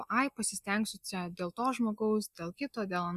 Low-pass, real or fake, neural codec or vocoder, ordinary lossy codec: 14.4 kHz; real; none; Opus, 64 kbps